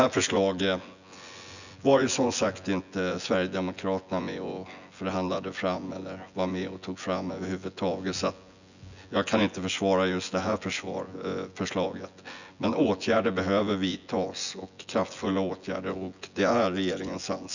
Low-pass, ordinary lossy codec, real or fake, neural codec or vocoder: 7.2 kHz; none; fake; vocoder, 24 kHz, 100 mel bands, Vocos